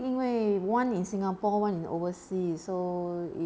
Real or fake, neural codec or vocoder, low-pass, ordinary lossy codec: real; none; none; none